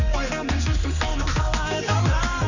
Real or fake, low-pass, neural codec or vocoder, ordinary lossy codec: fake; 7.2 kHz; codec, 16 kHz, 2 kbps, X-Codec, HuBERT features, trained on general audio; none